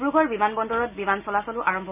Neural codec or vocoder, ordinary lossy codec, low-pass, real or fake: none; none; 3.6 kHz; real